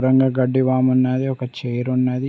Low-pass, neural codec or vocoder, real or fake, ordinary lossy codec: none; none; real; none